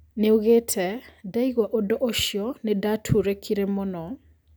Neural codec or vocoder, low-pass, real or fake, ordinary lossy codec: none; none; real; none